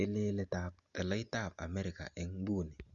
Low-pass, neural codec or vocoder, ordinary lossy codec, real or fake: 7.2 kHz; none; Opus, 64 kbps; real